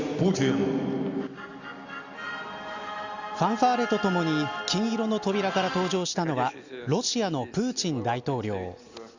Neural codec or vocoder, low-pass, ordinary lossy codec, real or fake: none; 7.2 kHz; Opus, 64 kbps; real